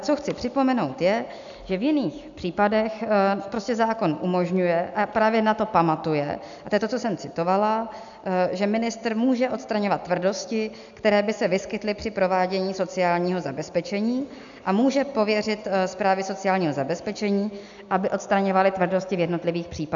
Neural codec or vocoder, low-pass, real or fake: none; 7.2 kHz; real